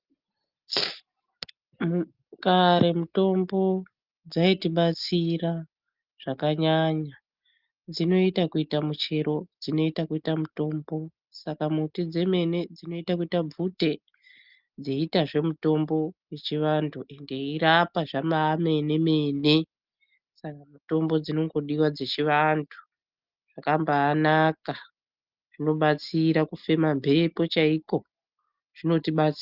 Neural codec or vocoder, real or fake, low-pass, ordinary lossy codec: none; real; 5.4 kHz; Opus, 24 kbps